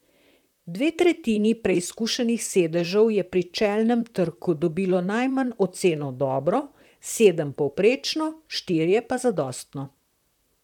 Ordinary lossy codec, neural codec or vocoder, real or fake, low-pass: none; vocoder, 44.1 kHz, 128 mel bands, Pupu-Vocoder; fake; 19.8 kHz